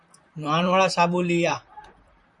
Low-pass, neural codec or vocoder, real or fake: 10.8 kHz; vocoder, 44.1 kHz, 128 mel bands, Pupu-Vocoder; fake